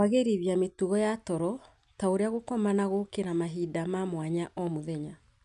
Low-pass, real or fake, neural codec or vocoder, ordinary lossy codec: 10.8 kHz; real; none; none